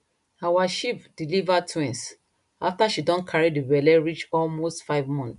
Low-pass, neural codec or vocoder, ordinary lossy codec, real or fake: 10.8 kHz; none; none; real